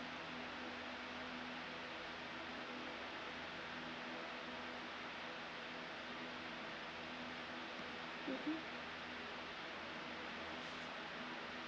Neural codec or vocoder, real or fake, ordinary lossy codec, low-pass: none; real; none; none